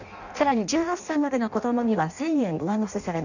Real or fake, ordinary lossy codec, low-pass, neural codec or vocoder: fake; none; 7.2 kHz; codec, 16 kHz in and 24 kHz out, 0.6 kbps, FireRedTTS-2 codec